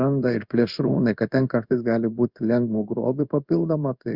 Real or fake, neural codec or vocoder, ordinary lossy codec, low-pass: fake; codec, 16 kHz in and 24 kHz out, 1 kbps, XY-Tokenizer; Opus, 64 kbps; 5.4 kHz